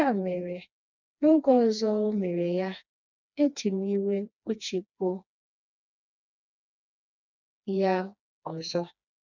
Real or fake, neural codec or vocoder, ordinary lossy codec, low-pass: fake; codec, 16 kHz, 2 kbps, FreqCodec, smaller model; none; 7.2 kHz